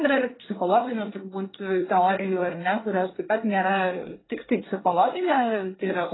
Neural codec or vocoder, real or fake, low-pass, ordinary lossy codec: codec, 24 kHz, 1 kbps, SNAC; fake; 7.2 kHz; AAC, 16 kbps